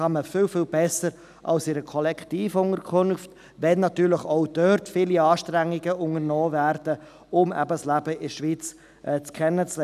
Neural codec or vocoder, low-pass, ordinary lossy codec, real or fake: none; 14.4 kHz; none; real